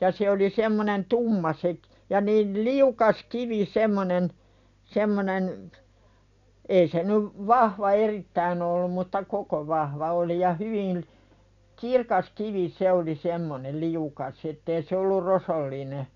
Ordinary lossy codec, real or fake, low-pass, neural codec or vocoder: none; real; 7.2 kHz; none